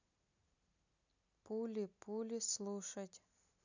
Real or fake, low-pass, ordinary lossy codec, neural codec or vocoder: real; 7.2 kHz; none; none